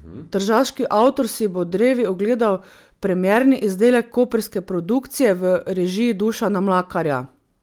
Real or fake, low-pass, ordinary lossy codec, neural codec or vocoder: real; 19.8 kHz; Opus, 32 kbps; none